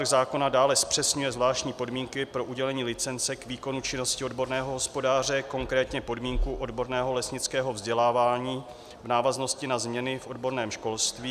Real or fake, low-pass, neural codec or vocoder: real; 14.4 kHz; none